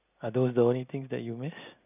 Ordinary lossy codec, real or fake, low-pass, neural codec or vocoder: none; real; 3.6 kHz; none